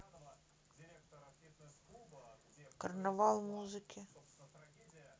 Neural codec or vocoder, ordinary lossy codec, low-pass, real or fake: none; none; none; real